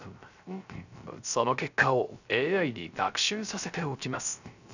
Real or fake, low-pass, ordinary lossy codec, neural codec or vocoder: fake; 7.2 kHz; none; codec, 16 kHz, 0.3 kbps, FocalCodec